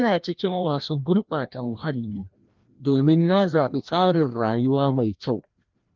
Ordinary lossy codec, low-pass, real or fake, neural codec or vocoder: Opus, 32 kbps; 7.2 kHz; fake; codec, 16 kHz, 1 kbps, FreqCodec, larger model